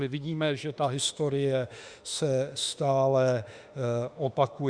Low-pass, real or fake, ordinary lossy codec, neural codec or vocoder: 9.9 kHz; fake; Opus, 64 kbps; autoencoder, 48 kHz, 32 numbers a frame, DAC-VAE, trained on Japanese speech